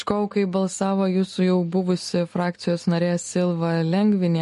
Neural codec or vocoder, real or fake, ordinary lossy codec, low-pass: none; real; MP3, 48 kbps; 14.4 kHz